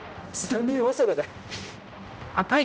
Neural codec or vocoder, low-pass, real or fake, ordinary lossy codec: codec, 16 kHz, 0.5 kbps, X-Codec, HuBERT features, trained on general audio; none; fake; none